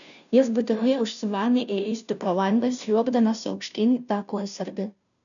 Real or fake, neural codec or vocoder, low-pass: fake; codec, 16 kHz, 0.5 kbps, FunCodec, trained on Chinese and English, 25 frames a second; 7.2 kHz